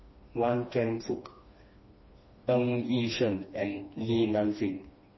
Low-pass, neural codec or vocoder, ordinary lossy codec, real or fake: 7.2 kHz; codec, 16 kHz, 2 kbps, FreqCodec, smaller model; MP3, 24 kbps; fake